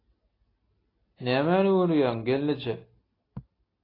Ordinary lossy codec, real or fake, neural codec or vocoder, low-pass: AAC, 24 kbps; real; none; 5.4 kHz